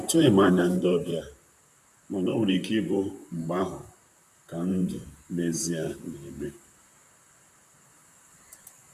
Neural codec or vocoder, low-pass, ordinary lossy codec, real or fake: vocoder, 44.1 kHz, 128 mel bands, Pupu-Vocoder; 14.4 kHz; none; fake